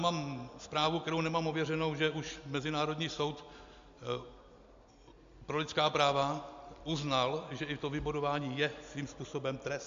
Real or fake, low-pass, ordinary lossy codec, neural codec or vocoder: real; 7.2 kHz; AAC, 64 kbps; none